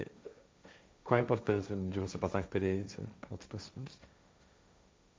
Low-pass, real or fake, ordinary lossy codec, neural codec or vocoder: none; fake; none; codec, 16 kHz, 1.1 kbps, Voila-Tokenizer